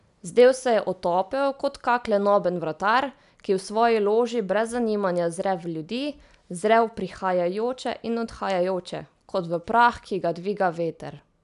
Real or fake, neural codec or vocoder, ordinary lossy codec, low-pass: real; none; none; 10.8 kHz